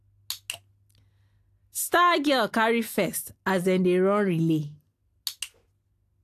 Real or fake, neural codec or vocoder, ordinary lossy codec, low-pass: real; none; AAC, 64 kbps; 14.4 kHz